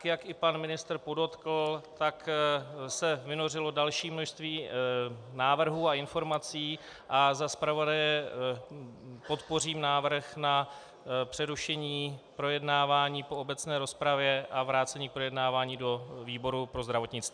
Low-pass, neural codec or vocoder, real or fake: 9.9 kHz; none; real